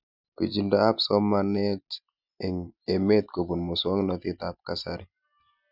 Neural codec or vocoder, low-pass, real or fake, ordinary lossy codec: none; 5.4 kHz; real; none